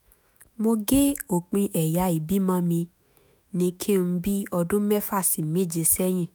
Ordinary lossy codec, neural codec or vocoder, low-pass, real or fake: none; autoencoder, 48 kHz, 128 numbers a frame, DAC-VAE, trained on Japanese speech; none; fake